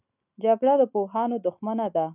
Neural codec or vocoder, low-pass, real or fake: none; 3.6 kHz; real